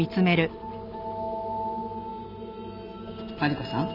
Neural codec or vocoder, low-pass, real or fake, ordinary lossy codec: none; 5.4 kHz; real; none